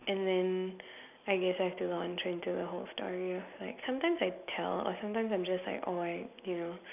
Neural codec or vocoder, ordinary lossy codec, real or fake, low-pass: none; none; real; 3.6 kHz